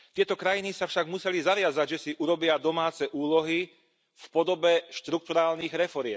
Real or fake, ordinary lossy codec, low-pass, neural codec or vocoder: real; none; none; none